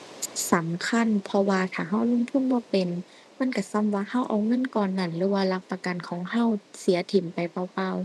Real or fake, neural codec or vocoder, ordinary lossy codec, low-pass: fake; vocoder, 24 kHz, 100 mel bands, Vocos; none; none